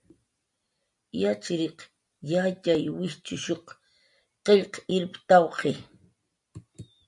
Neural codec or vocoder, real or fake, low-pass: none; real; 10.8 kHz